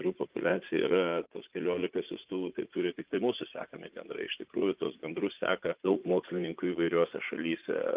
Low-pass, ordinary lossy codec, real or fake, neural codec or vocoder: 3.6 kHz; Opus, 32 kbps; fake; vocoder, 44.1 kHz, 80 mel bands, Vocos